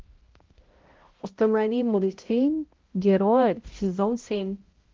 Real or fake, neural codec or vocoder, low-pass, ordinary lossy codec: fake; codec, 16 kHz, 0.5 kbps, X-Codec, HuBERT features, trained on balanced general audio; 7.2 kHz; Opus, 16 kbps